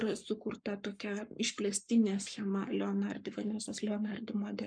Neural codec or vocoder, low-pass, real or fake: codec, 44.1 kHz, 7.8 kbps, Pupu-Codec; 9.9 kHz; fake